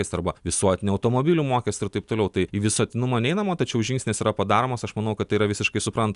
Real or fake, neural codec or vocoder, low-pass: real; none; 10.8 kHz